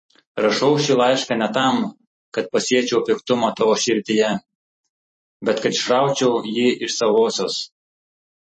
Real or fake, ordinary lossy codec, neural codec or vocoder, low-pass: fake; MP3, 32 kbps; vocoder, 44.1 kHz, 128 mel bands every 256 samples, BigVGAN v2; 10.8 kHz